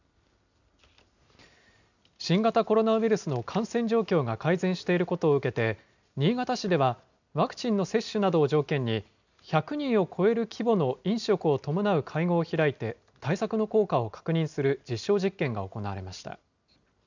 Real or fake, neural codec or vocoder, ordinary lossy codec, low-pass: real; none; none; 7.2 kHz